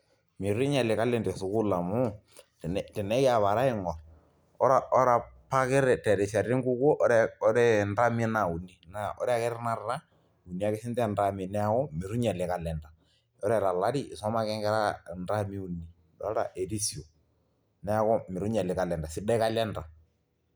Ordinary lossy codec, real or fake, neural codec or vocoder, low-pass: none; real; none; none